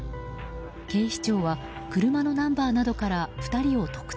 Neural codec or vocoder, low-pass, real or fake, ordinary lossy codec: none; none; real; none